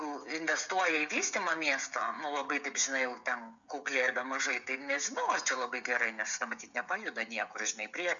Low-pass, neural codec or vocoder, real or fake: 7.2 kHz; codec, 16 kHz, 16 kbps, FreqCodec, smaller model; fake